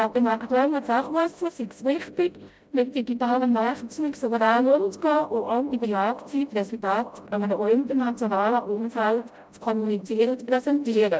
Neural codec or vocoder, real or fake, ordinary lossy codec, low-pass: codec, 16 kHz, 0.5 kbps, FreqCodec, smaller model; fake; none; none